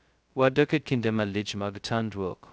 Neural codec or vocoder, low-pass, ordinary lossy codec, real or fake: codec, 16 kHz, 0.2 kbps, FocalCodec; none; none; fake